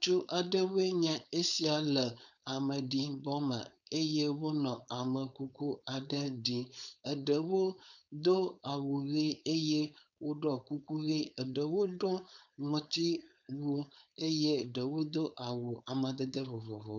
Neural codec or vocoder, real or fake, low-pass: codec, 16 kHz, 4.8 kbps, FACodec; fake; 7.2 kHz